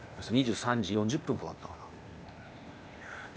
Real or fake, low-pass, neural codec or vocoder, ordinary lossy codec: fake; none; codec, 16 kHz, 0.8 kbps, ZipCodec; none